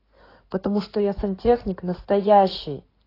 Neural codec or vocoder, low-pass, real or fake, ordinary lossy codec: codec, 16 kHz in and 24 kHz out, 2.2 kbps, FireRedTTS-2 codec; 5.4 kHz; fake; AAC, 24 kbps